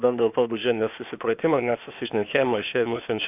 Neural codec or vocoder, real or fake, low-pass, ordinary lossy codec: codec, 16 kHz, 0.8 kbps, ZipCodec; fake; 3.6 kHz; AAC, 32 kbps